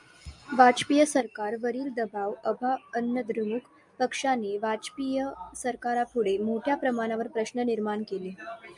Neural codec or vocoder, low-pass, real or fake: none; 10.8 kHz; real